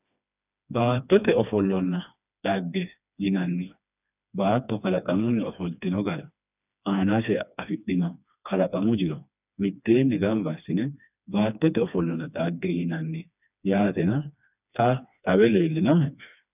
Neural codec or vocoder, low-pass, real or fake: codec, 16 kHz, 2 kbps, FreqCodec, smaller model; 3.6 kHz; fake